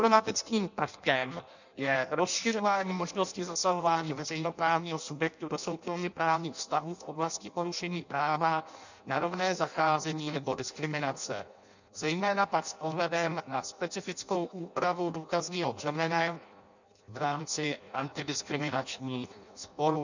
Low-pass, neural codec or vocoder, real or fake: 7.2 kHz; codec, 16 kHz in and 24 kHz out, 0.6 kbps, FireRedTTS-2 codec; fake